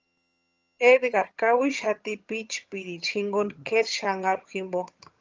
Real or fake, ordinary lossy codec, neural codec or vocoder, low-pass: fake; Opus, 24 kbps; vocoder, 22.05 kHz, 80 mel bands, HiFi-GAN; 7.2 kHz